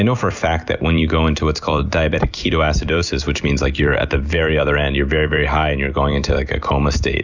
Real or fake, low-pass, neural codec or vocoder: fake; 7.2 kHz; vocoder, 44.1 kHz, 128 mel bands every 256 samples, BigVGAN v2